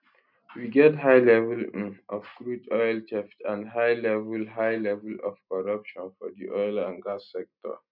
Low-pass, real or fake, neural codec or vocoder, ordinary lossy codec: 5.4 kHz; real; none; none